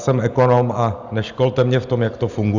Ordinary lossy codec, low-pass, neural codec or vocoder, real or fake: Opus, 64 kbps; 7.2 kHz; none; real